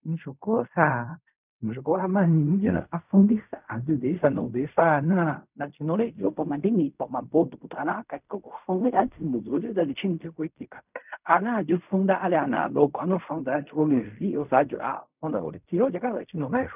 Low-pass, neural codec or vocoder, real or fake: 3.6 kHz; codec, 16 kHz in and 24 kHz out, 0.4 kbps, LongCat-Audio-Codec, fine tuned four codebook decoder; fake